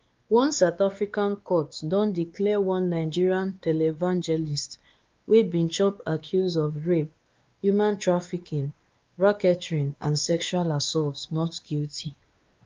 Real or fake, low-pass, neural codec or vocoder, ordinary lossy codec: fake; 7.2 kHz; codec, 16 kHz, 2 kbps, X-Codec, WavLM features, trained on Multilingual LibriSpeech; Opus, 32 kbps